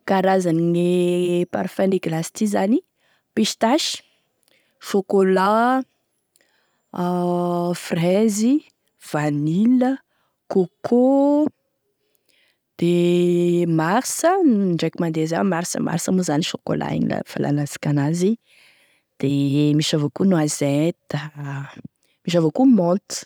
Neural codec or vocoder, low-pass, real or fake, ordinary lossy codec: none; none; real; none